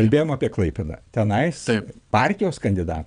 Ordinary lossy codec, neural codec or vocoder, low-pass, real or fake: MP3, 96 kbps; vocoder, 22.05 kHz, 80 mel bands, WaveNeXt; 9.9 kHz; fake